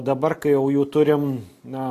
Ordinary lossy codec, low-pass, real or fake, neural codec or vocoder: AAC, 48 kbps; 14.4 kHz; real; none